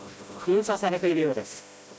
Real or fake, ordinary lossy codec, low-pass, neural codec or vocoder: fake; none; none; codec, 16 kHz, 0.5 kbps, FreqCodec, smaller model